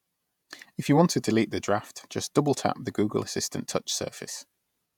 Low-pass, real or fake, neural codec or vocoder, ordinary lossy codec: 19.8 kHz; fake; vocoder, 48 kHz, 128 mel bands, Vocos; none